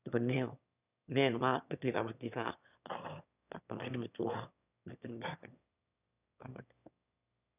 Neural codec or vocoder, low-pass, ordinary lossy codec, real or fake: autoencoder, 22.05 kHz, a latent of 192 numbers a frame, VITS, trained on one speaker; 3.6 kHz; none; fake